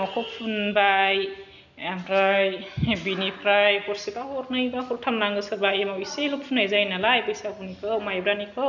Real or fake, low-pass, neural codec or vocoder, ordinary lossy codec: real; 7.2 kHz; none; none